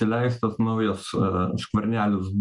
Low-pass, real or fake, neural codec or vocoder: 10.8 kHz; real; none